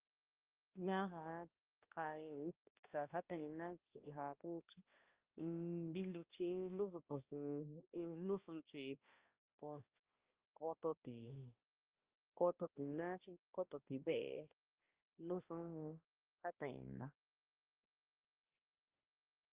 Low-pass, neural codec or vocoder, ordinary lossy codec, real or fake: 3.6 kHz; codec, 16 kHz, 1 kbps, X-Codec, HuBERT features, trained on balanced general audio; Opus, 32 kbps; fake